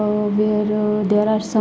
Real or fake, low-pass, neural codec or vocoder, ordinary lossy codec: real; none; none; none